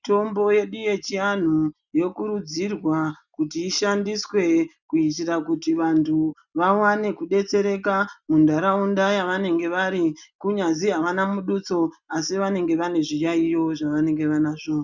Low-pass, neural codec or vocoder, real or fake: 7.2 kHz; none; real